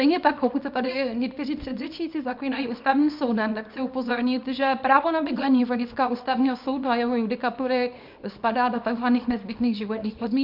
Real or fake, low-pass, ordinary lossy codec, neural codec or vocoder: fake; 5.4 kHz; MP3, 48 kbps; codec, 24 kHz, 0.9 kbps, WavTokenizer, small release